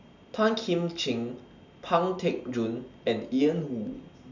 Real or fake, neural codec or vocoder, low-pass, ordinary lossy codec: real; none; 7.2 kHz; none